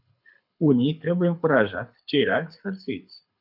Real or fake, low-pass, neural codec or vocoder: fake; 5.4 kHz; codec, 24 kHz, 6 kbps, HILCodec